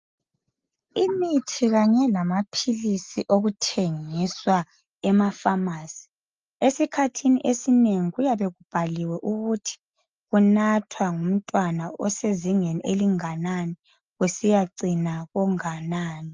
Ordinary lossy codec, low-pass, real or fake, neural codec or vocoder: Opus, 24 kbps; 7.2 kHz; real; none